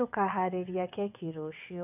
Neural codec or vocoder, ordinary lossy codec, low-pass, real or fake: vocoder, 22.05 kHz, 80 mel bands, WaveNeXt; none; 3.6 kHz; fake